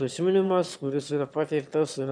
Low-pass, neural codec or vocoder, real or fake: 9.9 kHz; autoencoder, 22.05 kHz, a latent of 192 numbers a frame, VITS, trained on one speaker; fake